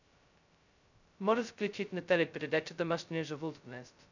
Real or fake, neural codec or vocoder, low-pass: fake; codec, 16 kHz, 0.2 kbps, FocalCodec; 7.2 kHz